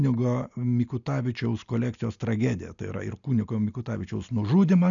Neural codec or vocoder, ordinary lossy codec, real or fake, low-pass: none; MP3, 96 kbps; real; 7.2 kHz